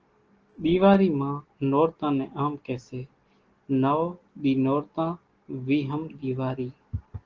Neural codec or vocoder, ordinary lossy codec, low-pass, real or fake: none; Opus, 16 kbps; 7.2 kHz; real